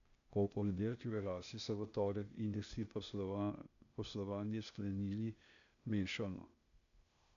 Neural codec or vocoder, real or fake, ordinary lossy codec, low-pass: codec, 16 kHz, 0.8 kbps, ZipCodec; fake; none; 7.2 kHz